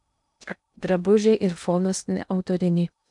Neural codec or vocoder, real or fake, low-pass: codec, 16 kHz in and 24 kHz out, 0.6 kbps, FocalCodec, streaming, 2048 codes; fake; 10.8 kHz